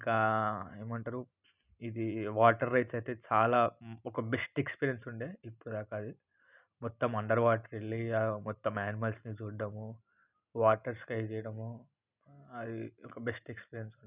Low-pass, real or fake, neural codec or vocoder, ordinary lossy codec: 3.6 kHz; real; none; none